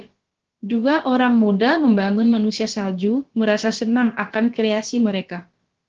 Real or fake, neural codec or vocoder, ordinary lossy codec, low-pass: fake; codec, 16 kHz, about 1 kbps, DyCAST, with the encoder's durations; Opus, 16 kbps; 7.2 kHz